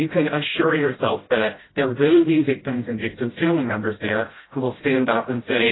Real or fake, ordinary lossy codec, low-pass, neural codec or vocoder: fake; AAC, 16 kbps; 7.2 kHz; codec, 16 kHz, 0.5 kbps, FreqCodec, smaller model